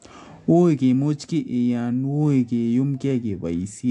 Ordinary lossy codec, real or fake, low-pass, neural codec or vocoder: AAC, 64 kbps; real; 10.8 kHz; none